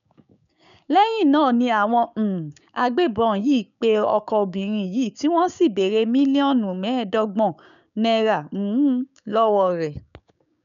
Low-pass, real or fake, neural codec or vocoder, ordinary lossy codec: 7.2 kHz; fake; codec, 16 kHz, 6 kbps, DAC; none